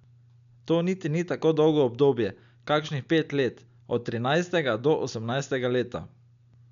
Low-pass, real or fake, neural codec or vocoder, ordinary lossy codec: 7.2 kHz; real; none; none